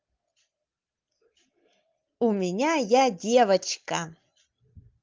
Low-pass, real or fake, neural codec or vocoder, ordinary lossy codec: 7.2 kHz; real; none; Opus, 32 kbps